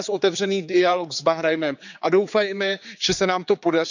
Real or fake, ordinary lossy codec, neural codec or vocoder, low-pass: fake; none; codec, 16 kHz, 4 kbps, X-Codec, HuBERT features, trained on general audio; 7.2 kHz